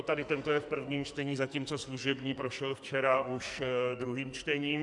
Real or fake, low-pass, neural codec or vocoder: fake; 10.8 kHz; codec, 44.1 kHz, 2.6 kbps, SNAC